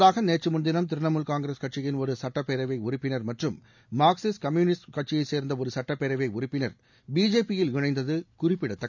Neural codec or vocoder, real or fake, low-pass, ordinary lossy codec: none; real; 7.2 kHz; none